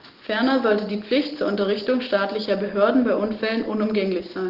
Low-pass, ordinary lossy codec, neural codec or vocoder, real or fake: 5.4 kHz; Opus, 16 kbps; none; real